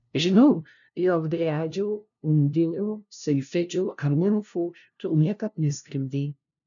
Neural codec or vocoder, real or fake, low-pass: codec, 16 kHz, 0.5 kbps, FunCodec, trained on LibriTTS, 25 frames a second; fake; 7.2 kHz